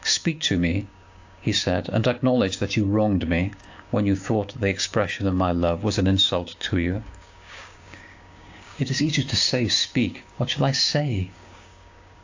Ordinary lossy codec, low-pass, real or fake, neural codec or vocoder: AAC, 48 kbps; 7.2 kHz; fake; codec, 16 kHz, 6 kbps, DAC